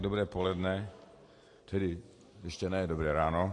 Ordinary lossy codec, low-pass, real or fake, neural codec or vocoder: AAC, 48 kbps; 10.8 kHz; real; none